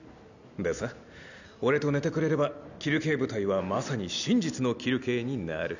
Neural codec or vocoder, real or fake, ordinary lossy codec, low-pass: none; real; none; 7.2 kHz